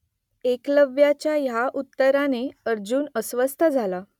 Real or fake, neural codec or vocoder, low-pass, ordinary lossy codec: real; none; 19.8 kHz; none